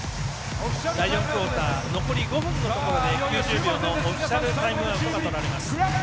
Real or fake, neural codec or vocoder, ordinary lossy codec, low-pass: real; none; none; none